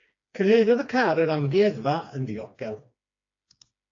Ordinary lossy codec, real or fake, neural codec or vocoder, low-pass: AAC, 48 kbps; fake; codec, 16 kHz, 2 kbps, FreqCodec, smaller model; 7.2 kHz